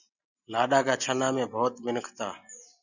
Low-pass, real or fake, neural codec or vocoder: 7.2 kHz; real; none